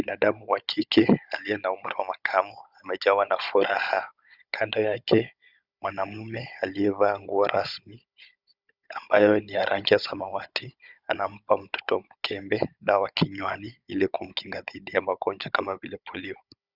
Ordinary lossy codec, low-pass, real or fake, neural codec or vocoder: Opus, 64 kbps; 5.4 kHz; fake; codec, 16 kHz, 16 kbps, FunCodec, trained on Chinese and English, 50 frames a second